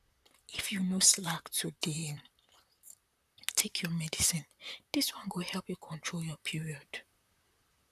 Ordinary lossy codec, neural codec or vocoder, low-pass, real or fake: none; vocoder, 44.1 kHz, 128 mel bands, Pupu-Vocoder; 14.4 kHz; fake